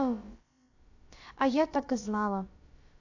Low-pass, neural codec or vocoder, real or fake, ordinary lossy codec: 7.2 kHz; codec, 16 kHz, about 1 kbps, DyCAST, with the encoder's durations; fake; AAC, 48 kbps